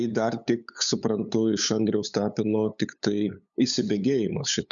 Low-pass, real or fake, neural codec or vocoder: 7.2 kHz; fake; codec, 16 kHz, 8 kbps, FunCodec, trained on LibriTTS, 25 frames a second